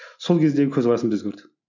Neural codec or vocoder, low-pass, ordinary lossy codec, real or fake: none; 7.2 kHz; none; real